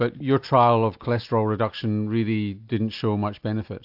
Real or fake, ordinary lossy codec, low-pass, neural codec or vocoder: real; AAC, 48 kbps; 5.4 kHz; none